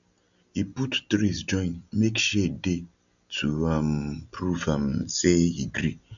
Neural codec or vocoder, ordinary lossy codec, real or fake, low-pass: none; none; real; 7.2 kHz